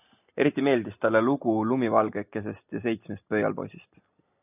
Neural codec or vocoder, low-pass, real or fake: none; 3.6 kHz; real